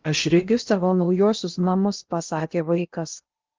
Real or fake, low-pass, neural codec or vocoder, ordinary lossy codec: fake; 7.2 kHz; codec, 16 kHz in and 24 kHz out, 0.8 kbps, FocalCodec, streaming, 65536 codes; Opus, 32 kbps